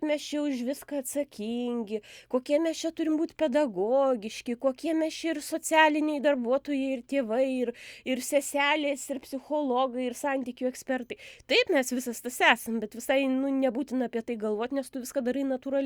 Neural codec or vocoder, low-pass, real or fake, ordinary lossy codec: none; 19.8 kHz; real; Opus, 64 kbps